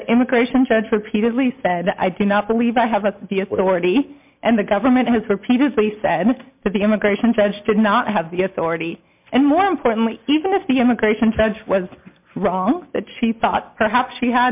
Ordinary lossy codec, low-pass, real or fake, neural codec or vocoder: MP3, 32 kbps; 3.6 kHz; real; none